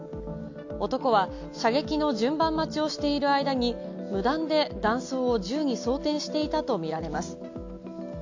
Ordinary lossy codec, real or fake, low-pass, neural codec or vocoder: AAC, 48 kbps; real; 7.2 kHz; none